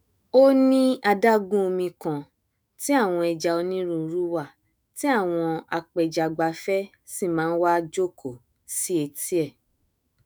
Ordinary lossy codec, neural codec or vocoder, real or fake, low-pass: none; autoencoder, 48 kHz, 128 numbers a frame, DAC-VAE, trained on Japanese speech; fake; none